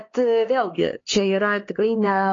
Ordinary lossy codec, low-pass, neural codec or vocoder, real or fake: AAC, 32 kbps; 7.2 kHz; codec, 16 kHz, 2 kbps, X-Codec, HuBERT features, trained on LibriSpeech; fake